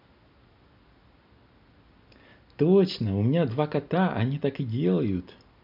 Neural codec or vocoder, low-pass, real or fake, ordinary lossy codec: none; 5.4 kHz; real; none